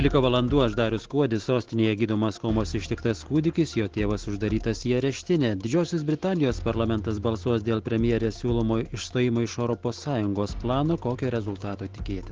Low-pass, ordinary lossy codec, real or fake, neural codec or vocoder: 7.2 kHz; Opus, 16 kbps; real; none